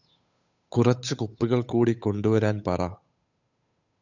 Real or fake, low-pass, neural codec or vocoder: fake; 7.2 kHz; codec, 16 kHz, 8 kbps, FunCodec, trained on Chinese and English, 25 frames a second